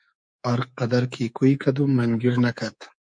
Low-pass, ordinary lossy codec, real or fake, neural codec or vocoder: 9.9 kHz; MP3, 64 kbps; fake; codec, 24 kHz, 3.1 kbps, DualCodec